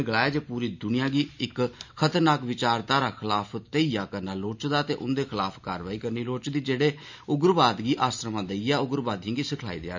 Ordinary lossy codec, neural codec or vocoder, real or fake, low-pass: MP3, 64 kbps; none; real; 7.2 kHz